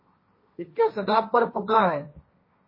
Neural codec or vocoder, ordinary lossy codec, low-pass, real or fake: codec, 16 kHz, 1.1 kbps, Voila-Tokenizer; MP3, 24 kbps; 5.4 kHz; fake